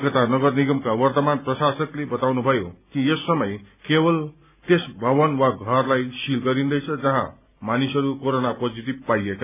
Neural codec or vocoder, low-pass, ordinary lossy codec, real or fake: none; 3.6 kHz; none; real